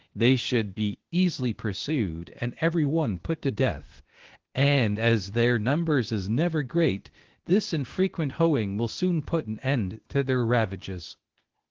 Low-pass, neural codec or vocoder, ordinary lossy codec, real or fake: 7.2 kHz; codec, 16 kHz, 0.8 kbps, ZipCodec; Opus, 16 kbps; fake